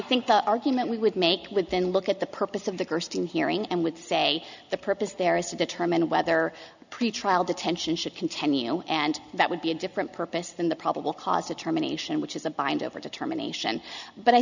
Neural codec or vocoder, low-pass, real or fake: none; 7.2 kHz; real